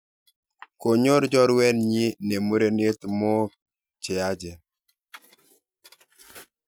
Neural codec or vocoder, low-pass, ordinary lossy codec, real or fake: none; none; none; real